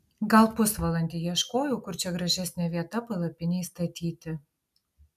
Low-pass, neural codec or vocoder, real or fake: 14.4 kHz; none; real